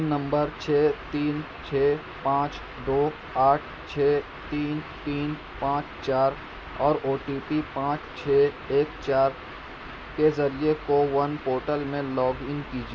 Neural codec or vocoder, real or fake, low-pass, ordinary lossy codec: none; real; none; none